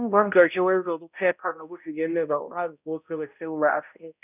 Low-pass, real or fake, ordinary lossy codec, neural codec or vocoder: 3.6 kHz; fake; none; codec, 16 kHz, 0.5 kbps, X-Codec, HuBERT features, trained on balanced general audio